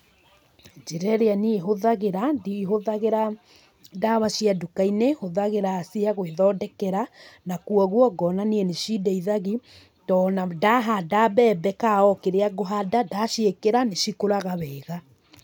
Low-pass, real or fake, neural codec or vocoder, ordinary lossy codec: none; real; none; none